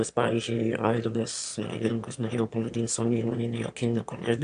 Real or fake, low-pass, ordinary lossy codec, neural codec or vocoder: fake; 9.9 kHz; AAC, 64 kbps; autoencoder, 22.05 kHz, a latent of 192 numbers a frame, VITS, trained on one speaker